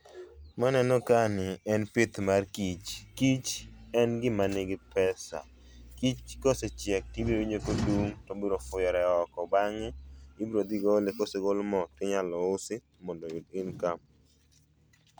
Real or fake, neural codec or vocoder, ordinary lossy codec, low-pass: real; none; none; none